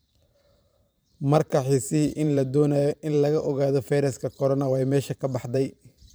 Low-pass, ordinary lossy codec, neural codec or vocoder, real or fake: none; none; vocoder, 44.1 kHz, 128 mel bands every 512 samples, BigVGAN v2; fake